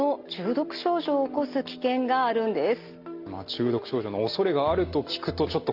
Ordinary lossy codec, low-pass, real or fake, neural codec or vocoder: Opus, 32 kbps; 5.4 kHz; real; none